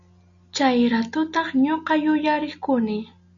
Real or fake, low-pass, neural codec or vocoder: real; 7.2 kHz; none